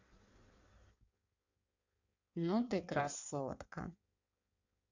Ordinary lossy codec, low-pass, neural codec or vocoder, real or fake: none; 7.2 kHz; codec, 16 kHz in and 24 kHz out, 1.1 kbps, FireRedTTS-2 codec; fake